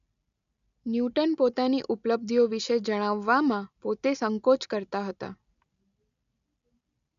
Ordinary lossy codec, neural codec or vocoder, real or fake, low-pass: none; none; real; 7.2 kHz